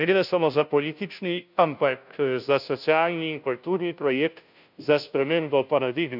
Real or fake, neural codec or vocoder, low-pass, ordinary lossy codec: fake; codec, 16 kHz, 0.5 kbps, FunCodec, trained on Chinese and English, 25 frames a second; 5.4 kHz; none